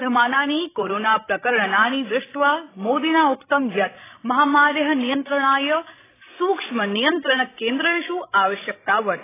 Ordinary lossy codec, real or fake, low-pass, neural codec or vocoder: AAC, 16 kbps; fake; 3.6 kHz; codec, 16 kHz, 16 kbps, FreqCodec, larger model